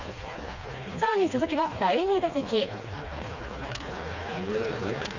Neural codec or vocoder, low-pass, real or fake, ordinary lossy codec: codec, 16 kHz, 2 kbps, FreqCodec, smaller model; 7.2 kHz; fake; Opus, 64 kbps